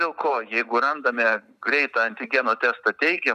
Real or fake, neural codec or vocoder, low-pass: real; none; 14.4 kHz